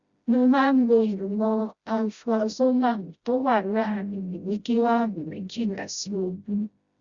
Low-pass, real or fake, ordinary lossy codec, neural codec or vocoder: 7.2 kHz; fake; Opus, 64 kbps; codec, 16 kHz, 0.5 kbps, FreqCodec, smaller model